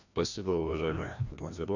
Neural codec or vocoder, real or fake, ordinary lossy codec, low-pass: codec, 16 kHz, 1 kbps, FreqCodec, larger model; fake; none; 7.2 kHz